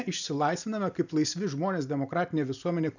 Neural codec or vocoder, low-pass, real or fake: none; 7.2 kHz; real